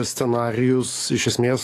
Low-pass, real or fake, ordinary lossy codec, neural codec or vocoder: 14.4 kHz; fake; AAC, 48 kbps; codec, 44.1 kHz, 7.8 kbps, DAC